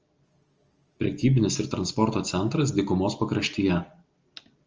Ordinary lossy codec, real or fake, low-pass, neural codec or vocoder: Opus, 24 kbps; real; 7.2 kHz; none